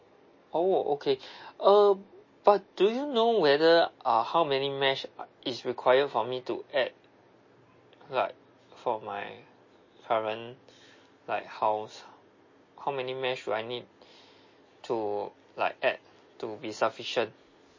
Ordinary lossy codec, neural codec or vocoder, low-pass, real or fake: MP3, 32 kbps; none; 7.2 kHz; real